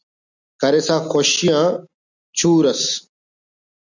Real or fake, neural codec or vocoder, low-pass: real; none; 7.2 kHz